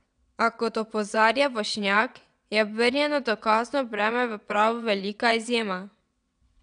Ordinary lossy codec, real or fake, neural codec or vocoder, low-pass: none; fake; vocoder, 22.05 kHz, 80 mel bands, WaveNeXt; 9.9 kHz